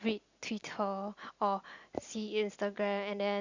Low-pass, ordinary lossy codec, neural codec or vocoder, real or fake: 7.2 kHz; Opus, 64 kbps; none; real